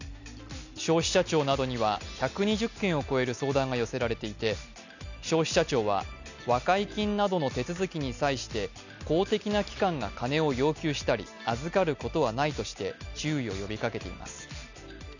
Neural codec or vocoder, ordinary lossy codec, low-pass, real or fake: none; none; 7.2 kHz; real